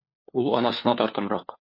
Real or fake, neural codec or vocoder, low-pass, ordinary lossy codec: fake; codec, 16 kHz, 4 kbps, FunCodec, trained on LibriTTS, 50 frames a second; 5.4 kHz; MP3, 32 kbps